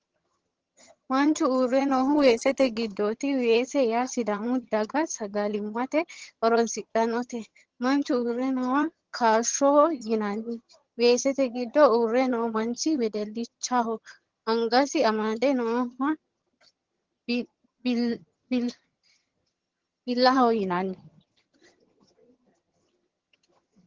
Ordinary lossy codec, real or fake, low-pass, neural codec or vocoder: Opus, 16 kbps; fake; 7.2 kHz; vocoder, 22.05 kHz, 80 mel bands, HiFi-GAN